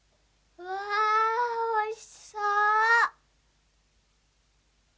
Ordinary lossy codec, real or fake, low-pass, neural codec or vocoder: none; real; none; none